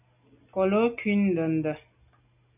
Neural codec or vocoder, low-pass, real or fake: none; 3.6 kHz; real